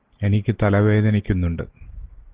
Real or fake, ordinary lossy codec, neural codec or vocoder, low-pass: real; Opus, 16 kbps; none; 3.6 kHz